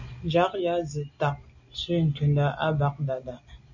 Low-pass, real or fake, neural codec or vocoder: 7.2 kHz; real; none